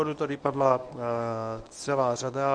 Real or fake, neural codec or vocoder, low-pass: fake; codec, 24 kHz, 0.9 kbps, WavTokenizer, medium speech release version 1; 9.9 kHz